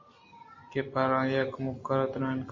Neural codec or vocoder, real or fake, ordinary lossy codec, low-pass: none; real; MP3, 32 kbps; 7.2 kHz